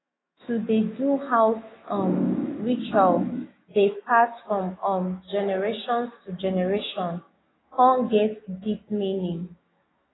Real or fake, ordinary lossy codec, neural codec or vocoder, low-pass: fake; AAC, 16 kbps; autoencoder, 48 kHz, 128 numbers a frame, DAC-VAE, trained on Japanese speech; 7.2 kHz